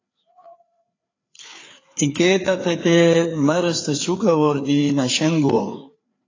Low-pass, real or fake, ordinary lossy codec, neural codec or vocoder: 7.2 kHz; fake; AAC, 32 kbps; codec, 16 kHz, 4 kbps, FreqCodec, larger model